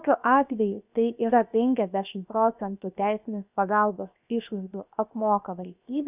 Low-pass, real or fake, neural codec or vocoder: 3.6 kHz; fake; codec, 16 kHz, 0.7 kbps, FocalCodec